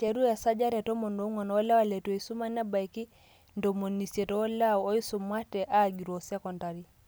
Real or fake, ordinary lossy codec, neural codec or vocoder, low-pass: real; none; none; none